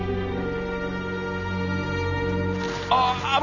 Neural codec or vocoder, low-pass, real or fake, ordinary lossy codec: none; 7.2 kHz; real; none